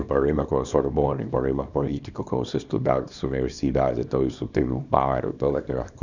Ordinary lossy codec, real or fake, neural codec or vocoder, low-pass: none; fake; codec, 24 kHz, 0.9 kbps, WavTokenizer, small release; 7.2 kHz